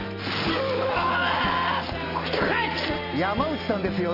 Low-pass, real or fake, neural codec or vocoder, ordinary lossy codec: 5.4 kHz; real; none; Opus, 32 kbps